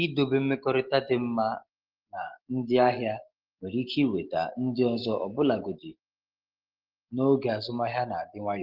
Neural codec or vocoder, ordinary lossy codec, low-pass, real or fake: none; Opus, 16 kbps; 5.4 kHz; real